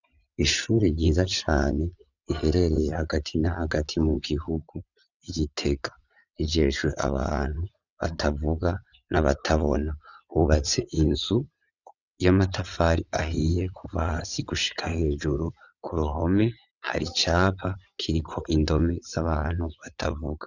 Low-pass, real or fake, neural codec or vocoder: 7.2 kHz; fake; vocoder, 22.05 kHz, 80 mel bands, WaveNeXt